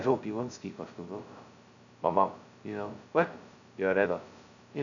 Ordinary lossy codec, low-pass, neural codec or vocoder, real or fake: none; 7.2 kHz; codec, 16 kHz, 0.2 kbps, FocalCodec; fake